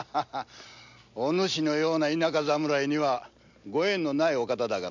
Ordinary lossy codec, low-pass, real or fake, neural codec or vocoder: MP3, 64 kbps; 7.2 kHz; real; none